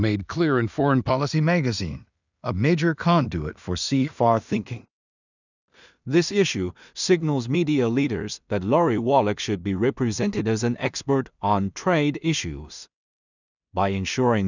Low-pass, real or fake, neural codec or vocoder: 7.2 kHz; fake; codec, 16 kHz in and 24 kHz out, 0.4 kbps, LongCat-Audio-Codec, two codebook decoder